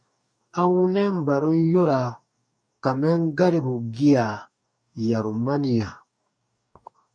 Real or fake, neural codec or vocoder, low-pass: fake; codec, 44.1 kHz, 2.6 kbps, DAC; 9.9 kHz